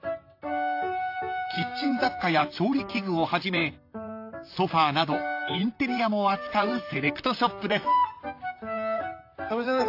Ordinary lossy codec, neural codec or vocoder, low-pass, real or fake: AAC, 32 kbps; vocoder, 44.1 kHz, 128 mel bands, Pupu-Vocoder; 5.4 kHz; fake